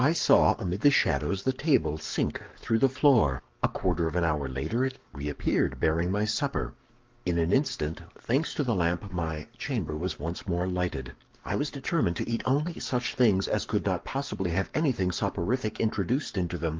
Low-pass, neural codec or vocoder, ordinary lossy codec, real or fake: 7.2 kHz; codec, 44.1 kHz, 7.8 kbps, Pupu-Codec; Opus, 24 kbps; fake